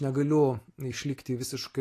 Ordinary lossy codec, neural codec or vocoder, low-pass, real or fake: AAC, 48 kbps; none; 14.4 kHz; real